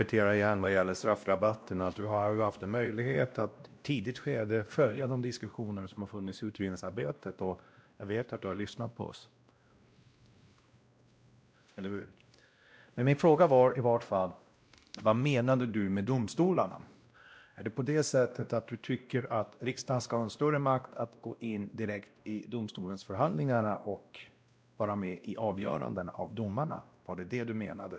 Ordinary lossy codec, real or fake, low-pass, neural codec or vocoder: none; fake; none; codec, 16 kHz, 1 kbps, X-Codec, WavLM features, trained on Multilingual LibriSpeech